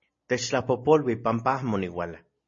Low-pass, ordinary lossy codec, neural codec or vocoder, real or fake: 7.2 kHz; MP3, 32 kbps; none; real